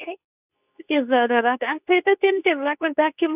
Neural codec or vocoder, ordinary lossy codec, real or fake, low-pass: codec, 24 kHz, 0.9 kbps, WavTokenizer, medium speech release version 2; none; fake; 3.6 kHz